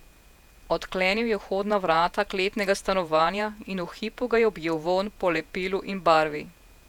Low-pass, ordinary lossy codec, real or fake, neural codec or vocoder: 19.8 kHz; none; fake; vocoder, 48 kHz, 128 mel bands, Vocos